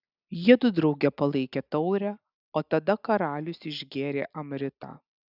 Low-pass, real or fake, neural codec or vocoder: 5.4 kHz; real; none